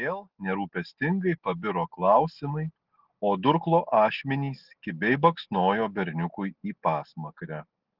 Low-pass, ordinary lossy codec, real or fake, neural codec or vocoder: 5.4 kHz; Opus, 16 kbps; real; none